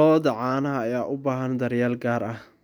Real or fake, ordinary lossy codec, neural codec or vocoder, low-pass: real; none; none; 19.8 kHz